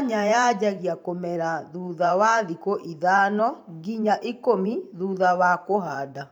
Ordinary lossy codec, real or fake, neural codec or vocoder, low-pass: none; fake; vocoder, 44.1 kHz, 128 mel bands every 512 samples, BigVGAN v2; 19.8 kHz